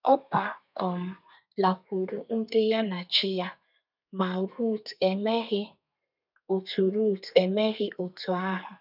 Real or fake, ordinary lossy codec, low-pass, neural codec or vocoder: fake; none; 5.4 kHz; codec, 32 kHz, 1.9 kbps, SNAC